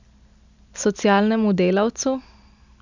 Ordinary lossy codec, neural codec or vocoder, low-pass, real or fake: none; none; 7.2 kHz; real